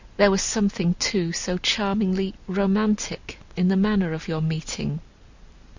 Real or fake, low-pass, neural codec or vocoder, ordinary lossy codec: real; 7.2 kHz; none; Opus, 64 kbps